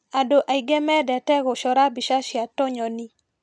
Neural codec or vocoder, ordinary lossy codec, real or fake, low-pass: none; none; real; none